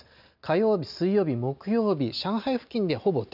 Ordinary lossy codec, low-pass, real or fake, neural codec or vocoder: none; 5.4 kHz; real; none